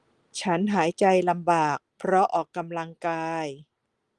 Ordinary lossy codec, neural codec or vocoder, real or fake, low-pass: Opus, 24 kbps; none; real; 10.8 kHz